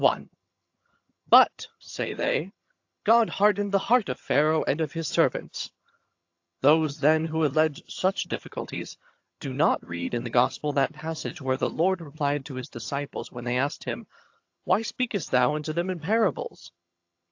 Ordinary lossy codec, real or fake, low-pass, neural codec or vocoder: AAC, 48 kbps; fake; 7.2 kHz; vocoder, 22.05 kHz, 80 mel bands, HiFi-GAN